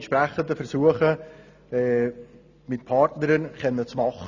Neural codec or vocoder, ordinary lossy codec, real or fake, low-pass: none; none; real; 7.2 kHz